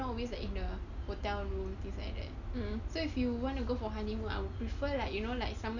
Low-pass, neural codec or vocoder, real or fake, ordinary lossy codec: 7.2 kHz; none; real; none